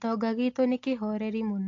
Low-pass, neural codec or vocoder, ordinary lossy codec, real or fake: 7.2 kHz; none; AAC, 48 kbps; real